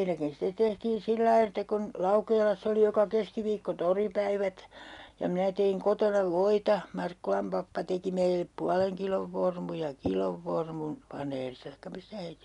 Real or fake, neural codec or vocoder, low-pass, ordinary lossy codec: real; none; 10.8 kHz; none